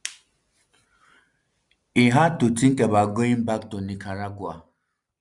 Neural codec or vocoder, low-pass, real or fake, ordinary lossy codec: none; 10.8 kHz; real; Opus, 64 kbps